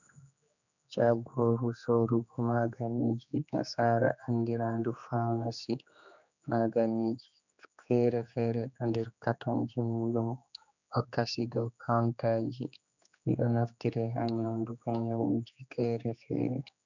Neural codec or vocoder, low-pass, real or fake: codec, 16 kHz, 2 kbps, X-Codec, HuBERT features, trained on general audio; 7.2 kHz; fake